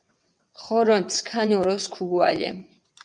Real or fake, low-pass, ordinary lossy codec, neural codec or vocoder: fake; 9.9 kHz; MP3, 96 kbps; vocoder, 22.05 kHz, 80 mel bands, WaveNeXt